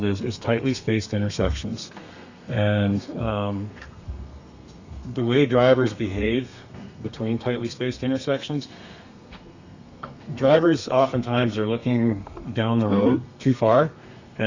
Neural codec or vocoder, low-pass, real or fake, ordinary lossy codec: codec, 32 kHz, 1.9 kbps, SNAC; 7.2 kHz; fake; Opus, 64 kbps